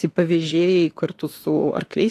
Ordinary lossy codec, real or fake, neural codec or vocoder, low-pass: AAC, 48 kbps; fake; autoencoder, 48 kHz, 32 numbers a frame, DAC-VAE, trained on Japanese speech; 14.4 kHz